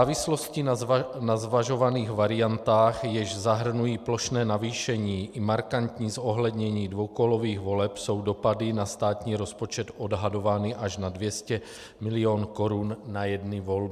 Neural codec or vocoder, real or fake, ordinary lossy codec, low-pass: none; real; AAC, 96 kbps; 14.4 kHz